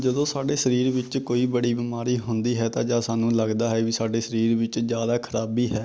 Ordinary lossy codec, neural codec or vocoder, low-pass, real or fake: none; none; none; real